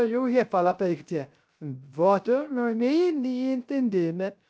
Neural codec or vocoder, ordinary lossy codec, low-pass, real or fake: codec, 16 kHz, 0.3 kbps, FocalCodec; none; none; fake